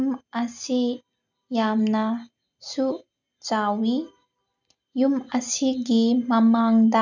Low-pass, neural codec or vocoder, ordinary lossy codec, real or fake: 7.2 kHz; none; none; real